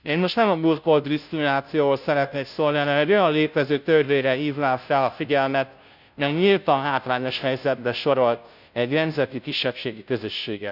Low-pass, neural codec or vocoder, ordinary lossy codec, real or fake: 5.4 kHz; codec, 16 kHz, 0.5 kbps, FunCodec, trained on Chinese and English, 25 frames a second; none; fake